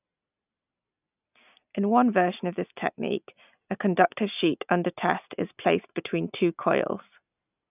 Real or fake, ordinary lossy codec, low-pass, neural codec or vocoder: real; none; 3.6 kHz; none